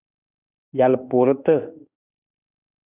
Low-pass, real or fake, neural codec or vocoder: 3.6 kHz; fake; autoencoder, 48 kHz, 32 numbers a frame, DAC-VAE, trained on Japanese speech